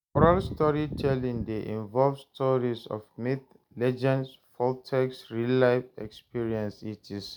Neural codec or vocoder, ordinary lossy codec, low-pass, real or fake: none; none; 19.8 kHz; real